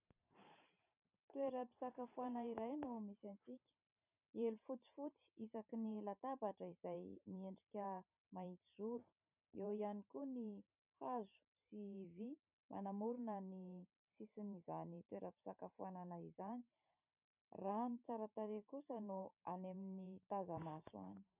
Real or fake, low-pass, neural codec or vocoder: fake; 3.6 kHz; vocoder, 44.1 kHz, 128 mel bands every 512 samples, BigVGAN v2